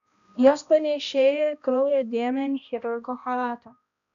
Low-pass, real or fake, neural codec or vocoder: 7.2 kHz; fake; codec, 16 kHz, 1 kbps, X-Codec, HuBERT features, trained on balanced general audio